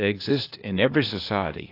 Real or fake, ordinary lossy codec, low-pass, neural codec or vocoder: fake; AAC, 32 kbps; 5.4 kHz; codec, 16 kHz, 0.8 kbps, ZipCodec